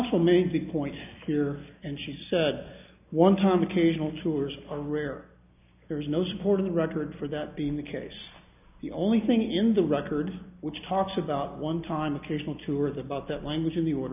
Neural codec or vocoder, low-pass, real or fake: none; 3.6 kHz; real